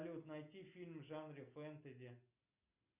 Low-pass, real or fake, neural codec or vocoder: 3.6 kHz; real; none